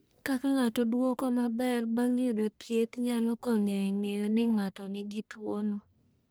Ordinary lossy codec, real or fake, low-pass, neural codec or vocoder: none; fake; none; codec, 44.1 kHz, 1.7 kbps, Pupu-Codec